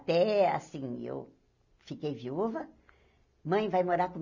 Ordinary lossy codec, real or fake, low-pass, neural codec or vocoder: none; real; 7.2 kHz; none